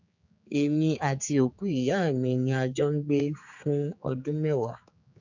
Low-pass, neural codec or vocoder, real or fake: 7.2 kHz; codec, 16 kHz, 4 kbps, X-Codec, HuBERT features, trained on general audio; fake